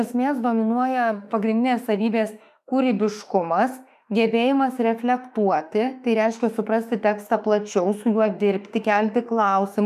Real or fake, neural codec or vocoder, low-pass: fake; autoencoder, 48 kHz, 32 numbers a frame, DAC-VAE, trained on Japanese speech; 14.4 kHz